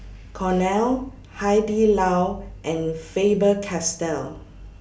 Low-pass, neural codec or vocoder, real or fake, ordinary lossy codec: none; none; real; none